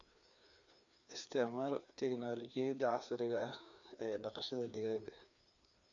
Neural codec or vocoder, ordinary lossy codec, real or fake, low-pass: codec, 16 kHz, 2 kbps, FreqCodec, larger model; none; fake; 7.2 kHz